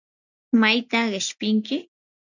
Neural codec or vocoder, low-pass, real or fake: none; 7.2 kHz; real